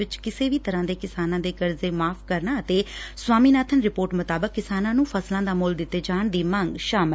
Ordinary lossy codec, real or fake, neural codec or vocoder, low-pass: none; real; none; none